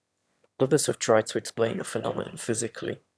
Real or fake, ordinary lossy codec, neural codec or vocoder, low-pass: fake; none; autoencoder, 22.05 kHz, a latent of 192 numbers a frame, VITS, trained on one speaker; none